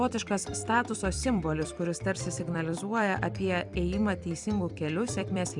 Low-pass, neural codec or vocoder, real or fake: 10.8 kHz; vocoder, 44.1 kHz, 128 mel bands every 256 samples, BigVGAN v2; fake